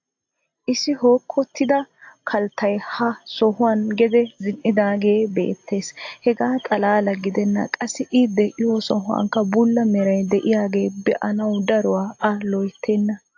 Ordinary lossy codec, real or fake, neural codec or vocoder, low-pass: AAC, 48 kbps; real; none; 7.2 kHz